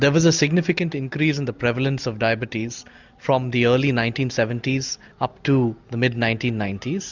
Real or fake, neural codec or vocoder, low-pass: real; none; 7.2 kHz